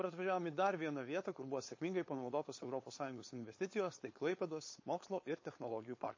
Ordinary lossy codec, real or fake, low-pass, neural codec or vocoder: MP3, 32 kbps; fake; 7.2 kHz; codec, 16 kHz, 4.8 kbps, FACodec